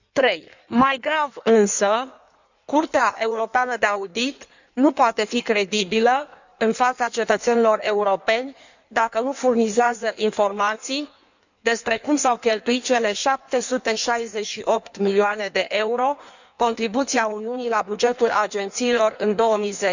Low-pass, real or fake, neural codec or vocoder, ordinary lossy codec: 7.2 kHz; fake; codec, 16 kHz in and 24 kHz out, 1.1 kbps, FireRedTTS-2 codec; none